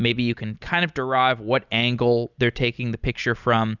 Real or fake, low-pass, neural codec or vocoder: real; 7.2 kHz; none